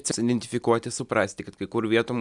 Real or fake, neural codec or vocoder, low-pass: real; none; 10.8 kHz